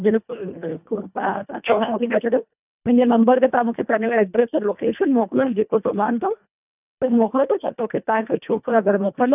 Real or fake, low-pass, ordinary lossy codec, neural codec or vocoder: fake; 3.6 kHz; none; codec, 24 kHz, 1.5 kbps, HILCodec